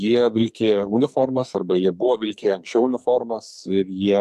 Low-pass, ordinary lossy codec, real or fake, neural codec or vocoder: 14.4 kHz; AAC, 96 kbps; fake; codec, 44.1 kHz, 2.6 kbps, SNAC